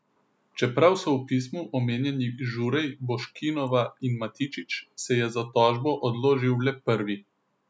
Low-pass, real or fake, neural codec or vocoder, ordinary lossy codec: none; real; none; none